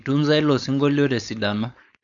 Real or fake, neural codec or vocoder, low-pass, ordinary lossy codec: fake; codec, 16 kHz, 4.8 kbps, FACodec; 7.2 kHz; none